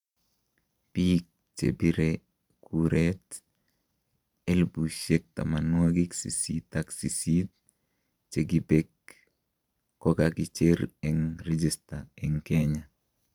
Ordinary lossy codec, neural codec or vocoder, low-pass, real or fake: none; vocoder, 44.1 kHz, 128 mel bands every 512 samples, BigVGAN v2; 19.8 kHz; fake